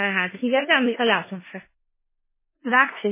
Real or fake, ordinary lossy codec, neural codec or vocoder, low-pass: fake; MP3, 16 kbps; codec, 16 kHz in and 24 kHz out, 0.4 kbps, LongCat-Audio-Codec, four codebook decoder; 3.6 kHz